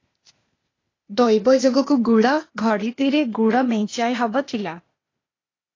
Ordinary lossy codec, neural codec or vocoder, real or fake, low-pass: AAC, 32 kbps; codec, 16 kHz, 0.8 kbps, ZipCodec; fake; 7.2 kHz